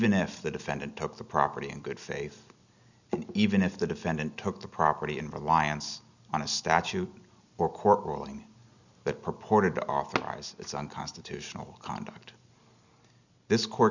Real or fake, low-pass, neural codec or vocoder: real; 7.2 kHz; none